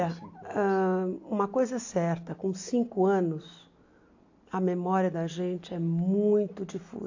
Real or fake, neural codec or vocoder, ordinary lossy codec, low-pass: real; none; AAC, 48 kbps; 7.2 kHz